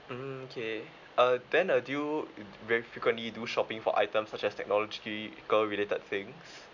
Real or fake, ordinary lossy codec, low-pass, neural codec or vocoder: real; none; 7.2 kHz; none